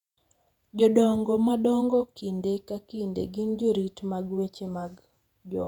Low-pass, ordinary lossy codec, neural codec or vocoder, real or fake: 19.8 kHz; none; vocoder, 48 kHz, 128 mel bands, Vocos; fake